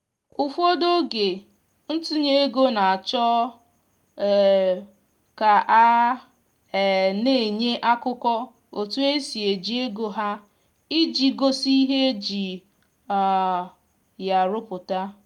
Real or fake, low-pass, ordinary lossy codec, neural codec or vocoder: real; 19.8 kHz; Opus, 32 kbps; none